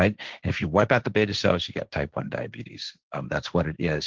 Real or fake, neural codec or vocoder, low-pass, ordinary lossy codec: fake; codec, 16 kHz, 1.1 kbps, Voila-Tokenizer; 7.2 kHz; Opus, 16 kbps